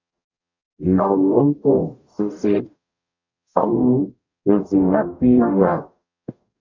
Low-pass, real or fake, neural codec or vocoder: 7.2 kHz; fake; codec, 44.1 kHz, 0.9 kbps, DAC